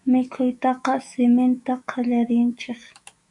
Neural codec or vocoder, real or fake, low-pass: autoencoder, 48 kHz, 128 numbers a frame, DAC-VAE, trained on Japanese speech; fake; 10.8 kHz